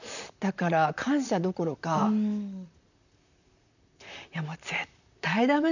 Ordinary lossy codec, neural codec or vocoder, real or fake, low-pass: none; vocoder, 44.1 kHz, 128 mel bands, Pupu-Vocoder; fake; 7.2 kHz